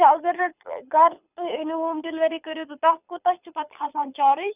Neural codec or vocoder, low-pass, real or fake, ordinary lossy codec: codec, 24 kHz, 6 kbps, HILCodec; 3.6 kHz; fake; none